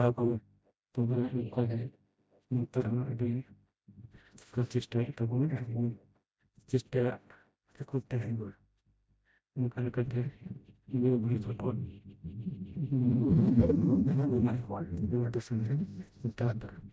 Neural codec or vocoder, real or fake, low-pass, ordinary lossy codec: codec, 16 kHz, 0.5 kbps, FreqCodec, smaller model; fake; none; none